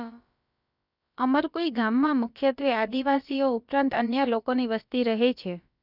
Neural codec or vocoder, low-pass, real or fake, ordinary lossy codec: codec, 16 kHz, about 1 kbps, DyCAST, with the encoder's durations; 5.4 kHz; fake; none